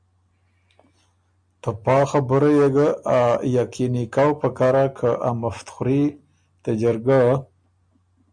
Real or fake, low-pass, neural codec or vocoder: real; 9.9 kHz; none